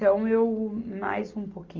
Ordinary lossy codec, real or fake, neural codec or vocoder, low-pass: Opus, 24 kbps; real; none; 7.2 kHz